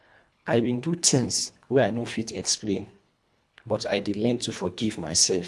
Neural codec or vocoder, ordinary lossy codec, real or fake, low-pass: codec, 24 kHz, 1.5 kbps, HILCodec; none; fake; none